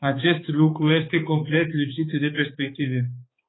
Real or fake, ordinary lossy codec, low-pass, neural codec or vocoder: fake; AAC, 16 kbps; 7.2 kHz; codec, 16 kHz, 4 kbps, X-Codec, HuBERT features, trained on balanced general audio